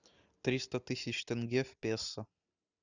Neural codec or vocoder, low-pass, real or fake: none; 7.2 kHz; real